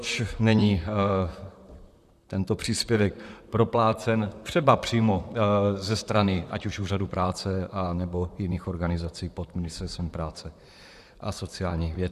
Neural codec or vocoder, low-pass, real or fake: vocoder, 44.1 kHz, 128 mel bands, Pupu-Vocoder; 14.4 kHz; fake